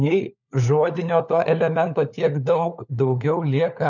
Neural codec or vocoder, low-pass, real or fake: codec, 16 kHz, 4 kbps, FunCodec, trained on LibriTTS, 50 frames a second; 7.2 kHz; fake